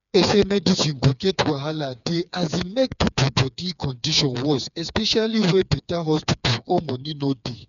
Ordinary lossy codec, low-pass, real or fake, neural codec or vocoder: none; 7.2 kHz; fake; codec, 16 kHz, 4 kbps, FreqCodec, smaller model